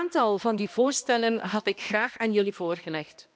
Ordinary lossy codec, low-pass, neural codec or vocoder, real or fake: none; none; codec, 16 kHz, 1 kbps, X-Codec, HuBERT features, trained on balanced general audio; fake